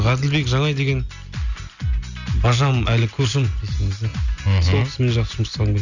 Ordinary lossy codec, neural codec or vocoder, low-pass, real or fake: none; none; 7.2 kHz; real